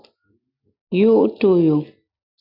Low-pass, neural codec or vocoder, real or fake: 5.4 kHz; none; real